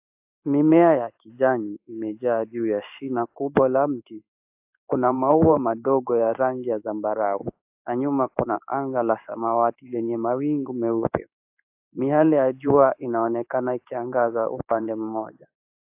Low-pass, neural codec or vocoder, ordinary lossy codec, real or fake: 3.6 kHz; codec, 16 kHz in and 24 kHz out, 1 kbps, XY-Tokenizer; AAC, 32 kbps; fake